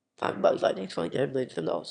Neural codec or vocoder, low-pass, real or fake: autoencoder, 22.05 kHz, a latent of 192 numbers a frame, VITS, trained on one speaker; 9.9 kHz; fake